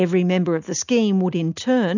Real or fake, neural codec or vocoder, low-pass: real; none; 7.2 kHz